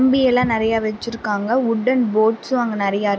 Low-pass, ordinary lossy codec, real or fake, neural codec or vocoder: none; none; real; none